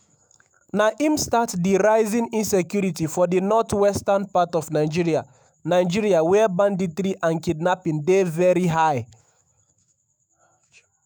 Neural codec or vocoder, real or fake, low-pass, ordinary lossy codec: autoencoder, 48 kHz, 128 numbers a frame, DAC-VAE, trained on Japanese speech; fake; none; none